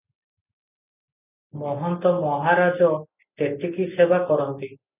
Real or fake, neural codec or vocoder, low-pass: real; none; 3.6 kHz